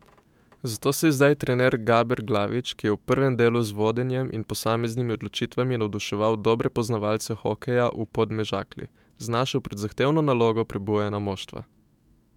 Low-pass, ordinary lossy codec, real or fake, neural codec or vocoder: 19.8 kHz; MP3, 96 kbps; fake; autoencoder, 48 kHz, 128 numbers a frame, DAC-VAE, trained on Japanese speech